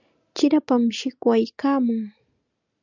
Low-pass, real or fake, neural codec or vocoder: 7.2 kHz; real; none